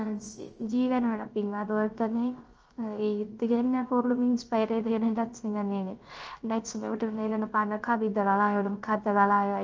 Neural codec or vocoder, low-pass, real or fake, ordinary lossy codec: codec, 16 kHz, 0.3 kbps, FocalCodec; 7.2 kHz; fake; Opus, 24 kbps